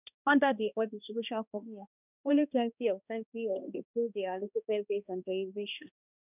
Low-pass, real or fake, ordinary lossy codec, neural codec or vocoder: 3.6 kHz; fake; none; codec, 16 kHz, 1 kbps, X-Codec, HuBERT features, trained on balanced general audio